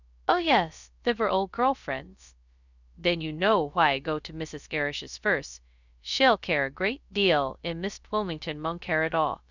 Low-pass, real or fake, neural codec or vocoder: 7.2 kHz; fake; codec, 16 kHz, 0.2 kbps, FocalCodec